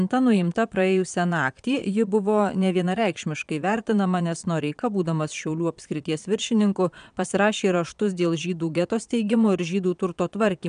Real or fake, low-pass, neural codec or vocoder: fake; 9.9 kHz; vocoder, 22.05 kHz, 80 mel bands, Vocos